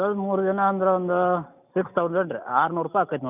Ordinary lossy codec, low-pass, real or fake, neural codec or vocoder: none; 3.6 kHz; real; none